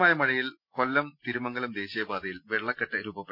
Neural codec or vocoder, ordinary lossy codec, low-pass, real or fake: none; none; 5.4 kHz; real